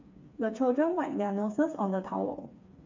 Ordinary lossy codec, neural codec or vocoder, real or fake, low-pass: MP3, 48 kbps; codec, 16 kHz, 4 kbps, FreqCodec, smaller model; fake; 7.2 kHz